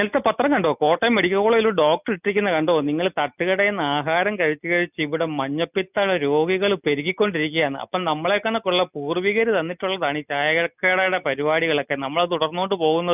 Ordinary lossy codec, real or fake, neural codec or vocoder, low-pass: none; real; none; 3.6 kHz